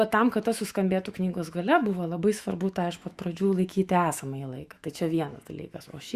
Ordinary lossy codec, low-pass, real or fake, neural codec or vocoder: Opus, 64 kbps; 14.4 kHz; fake; autoencoder, 48 kHz, 128 numbers a frame, DAC-VAE, trained on Japanese speech